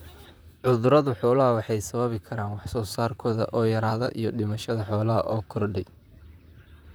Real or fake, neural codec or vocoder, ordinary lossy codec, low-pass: fake; vocoder, 44.1 kHz, 128 mel bands, Pupu-Vocoder; none; none